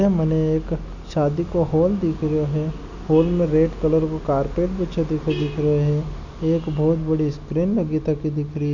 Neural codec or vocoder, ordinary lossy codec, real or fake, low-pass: none; none; real; 7.2 kHz